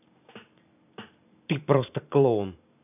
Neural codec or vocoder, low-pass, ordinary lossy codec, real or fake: vocoder, 44.1 kHz, 128 mel bands every 512 samples, BigVGAN v2; 3.6 kHz; none; fake